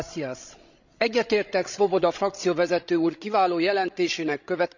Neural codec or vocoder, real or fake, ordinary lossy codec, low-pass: codec, 16 kHz, 16 kbps, FreqCodec, larger model; fake; none; 7.2 kHz